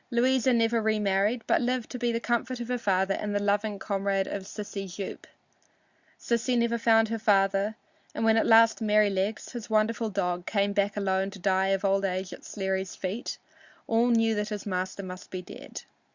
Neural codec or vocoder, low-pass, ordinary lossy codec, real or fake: none; 7.2 kHz; Opus, 64 kbps; real